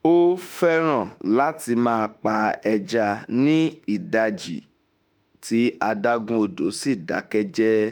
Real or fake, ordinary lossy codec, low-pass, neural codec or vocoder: fake; none; none; autoencoder, 48 kHz, 32 numbers a frame, DAC-VAE, trained on Japanese speech